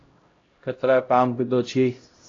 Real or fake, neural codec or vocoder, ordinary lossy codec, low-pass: fake; codec, 16 kHz, 0.5 kbps, X-Codec, HuBERT features, trained on LibriSpeech; AAC, 32 kbps; 7.2 kHz